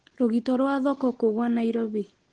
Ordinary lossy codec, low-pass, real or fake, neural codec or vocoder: Opus, 16 kbps; 9.9 kHz; real; none